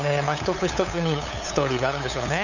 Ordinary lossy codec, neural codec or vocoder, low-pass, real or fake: none; codec, 16 kHz, 8 kbps, FunCodec, trained on LibriTTS, 25 frames a second; 7.2 kHz; fake